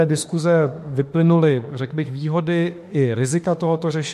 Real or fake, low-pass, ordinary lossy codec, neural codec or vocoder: fake; 14.4 kHz; MP3, 64 kbps; autoencoder, 48 kHz, 32 numbers a frame, DAC-VAE, trained on Japanese speech